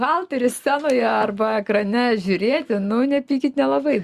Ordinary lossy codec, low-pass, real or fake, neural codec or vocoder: Opus, 64 kbps; 14.4 kHz; real; none